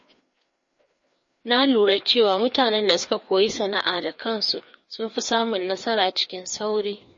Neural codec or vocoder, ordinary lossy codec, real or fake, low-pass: codec, 16 kHz, 2 kbps, FreqCodec, larger model; MP3, 32 kbps; fake; 7.2 kHz